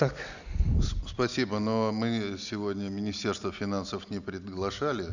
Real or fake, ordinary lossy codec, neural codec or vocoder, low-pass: real; none; none; 7.2 kHz